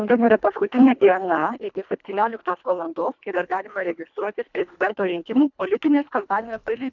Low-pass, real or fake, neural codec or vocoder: 7.2 kHz; fake; codec, 24 kHz, 1.5 kbps, HILCodec